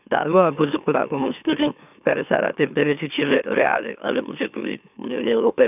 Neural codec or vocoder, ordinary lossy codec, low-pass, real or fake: autoencoder, 44.1 kHz, a latent of 192 numbers a frame, MeloTTS; none; 3.6 kHz; fake